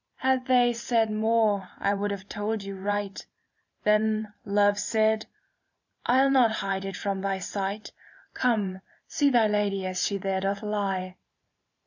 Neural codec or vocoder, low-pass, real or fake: vocoder, 44.1 kHz, 128 mel bands every 512 samples, BigVGAN v2; 7.2 kHz; fake